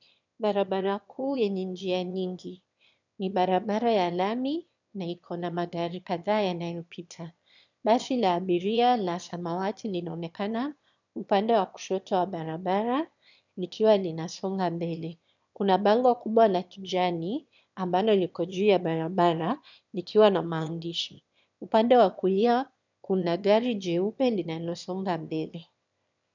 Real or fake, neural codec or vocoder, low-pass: fake; autoencoder, 22.05 kHz, a latent of 192 numbers a frame, VITS, trained on one speaker; 7.2 kHz